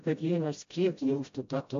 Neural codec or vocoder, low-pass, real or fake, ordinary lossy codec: codec, 16 kHz, 0.5 kbps, FreqCodec, smaller model; 7.2 kHz; fake; AAC, 48 kbps